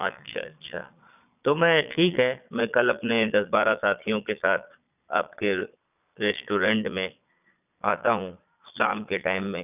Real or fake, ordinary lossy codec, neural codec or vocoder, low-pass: fake; none; vocoder, 22.05 kHz, 80 mel bands, Vocos; 3.6 kHz